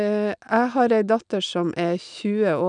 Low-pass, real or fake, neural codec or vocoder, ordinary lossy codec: 9.9 kHz; real; none; none